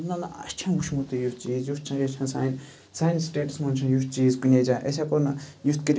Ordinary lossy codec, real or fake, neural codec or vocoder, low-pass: none; real; none; none